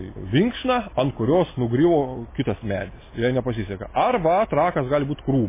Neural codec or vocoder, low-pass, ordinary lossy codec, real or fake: none; 3.6 kHz; MP3, 16 kbps; real